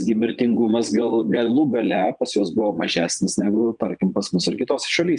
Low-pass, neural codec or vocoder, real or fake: 9.9 kHz; vocoder, 22.05 kHz, 80 mel bands, Vocos; fake